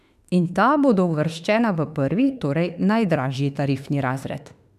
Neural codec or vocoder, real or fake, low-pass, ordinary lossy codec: autoencoder, 48 kHz, 32 numbers a frame, DAC-VAE, trained on Japanese speech; fake; 14.4 kHz; none